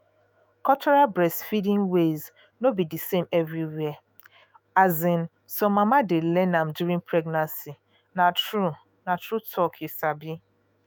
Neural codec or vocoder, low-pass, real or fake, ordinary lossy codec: autoencoder, 48 kHz, 128 numbers a frame, DAC-VAE, trained on Japanese speech; none; fake; none